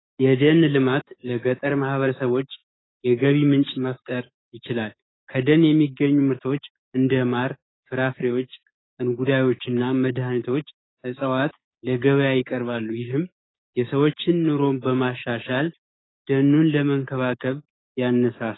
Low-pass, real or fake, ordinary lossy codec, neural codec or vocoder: 7.2 kHz; fake; AAC, 16 kbps; codec, 16 kHz, 6 kbps, DAC